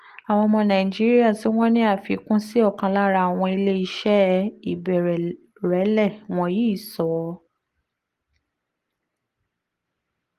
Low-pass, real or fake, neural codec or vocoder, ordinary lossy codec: 14.4 kHz; real; none; Opus, 24 kbps